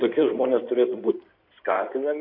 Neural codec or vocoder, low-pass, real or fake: codec, 16 kHz in and 24 kHz out, 2.2 kbps, FireRedTTS-2 codec; 5.4 kHz; fake